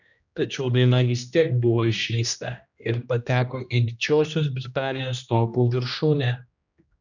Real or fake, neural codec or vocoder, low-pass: fake; codec, 16 kHz, 1 kbps, X-Codec, HuBERT features, trained on general audio; 7.2 kHz